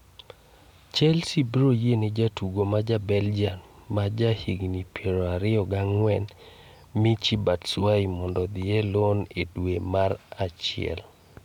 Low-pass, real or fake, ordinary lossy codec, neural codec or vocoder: 19.8 kHz; real; none; none